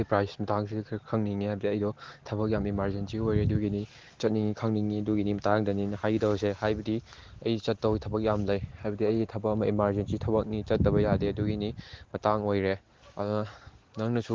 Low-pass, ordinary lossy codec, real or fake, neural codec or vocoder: 7.2 kHz; Opus, 16 kbps; real; none